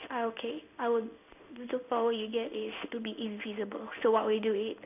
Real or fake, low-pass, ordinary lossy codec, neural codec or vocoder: fake; 3.6 kHz; none; codec, 16 kHz in and 24 kHz out, 1 kbps, XY-Tokenizer